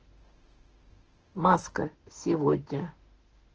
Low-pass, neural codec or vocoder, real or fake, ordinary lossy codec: 7.2 kHz; autoencoder, 48 kHz, 32 numbers a frame, DAC-VAE, trained on Japanese speech; fake; Opus, 16 kbps